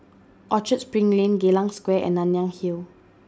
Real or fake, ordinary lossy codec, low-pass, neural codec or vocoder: real; none; none; none